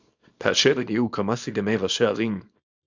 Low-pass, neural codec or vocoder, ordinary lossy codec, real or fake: 7.2 kHz; codec, 24 kHz, 0.9 kbps, WavTokenizer, small release; MP3, 64 kbps; fake